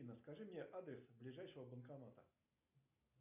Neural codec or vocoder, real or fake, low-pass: none; real; 3.6 kHz